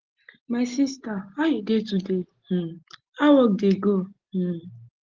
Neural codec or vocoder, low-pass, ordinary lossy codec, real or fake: none; 7.2 kHz; Opus, 16 kbps; real